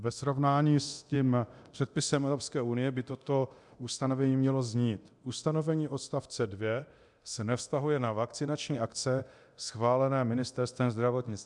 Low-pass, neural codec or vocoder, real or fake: 10.8 kHz; codec, 24 kHz, 0.9 kbps, DualCodec; fake